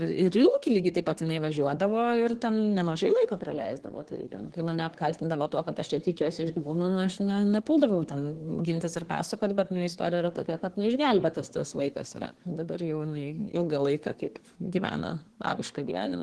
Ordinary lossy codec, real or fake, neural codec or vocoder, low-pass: Opus, 16 kbps; fake; codec, 24 kHz, 1 kbps, SNAC; 10.8 kHz